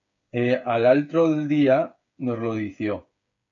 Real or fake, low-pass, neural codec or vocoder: fake; 7.2 kHz; codec, 16 kHz, 8 kbps, FreqCodec, smaller model